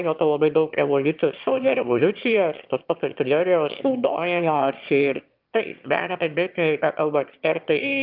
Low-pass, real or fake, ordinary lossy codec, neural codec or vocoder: 5.4 kHz; fake; Opus, 24 kbps; autoencoder, 22.05 kHz, a latent of 192 numbers a frame, VITS, trained on one speaker